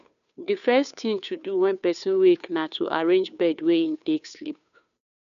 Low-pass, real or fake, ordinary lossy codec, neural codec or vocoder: 7.2 kHz; fake; none; codec, 16 kHz, 2 kbps, FunCodec, trained on Chinese and English, 25 frames a second